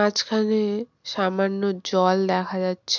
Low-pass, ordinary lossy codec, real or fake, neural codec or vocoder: 7.2 kHz; none; real; none